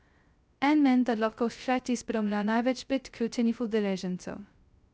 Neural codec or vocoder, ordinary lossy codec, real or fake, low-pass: codec, 16 kHz, 0.2 kbps, FocalCodec; none; fake; none